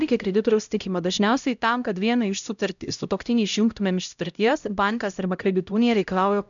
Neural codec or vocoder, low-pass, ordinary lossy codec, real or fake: codec, 16 kHz, 0.5 kbps, X-Codec, HuBERT features, trained on LibriSpeech; 7.2 kHz; MP3, 96 kbps; fake